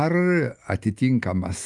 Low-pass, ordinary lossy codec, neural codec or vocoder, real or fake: 10.8 kHz; Opus, 32 kbps; none; real